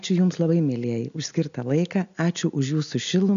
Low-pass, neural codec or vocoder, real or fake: 7.2 kHz; none; real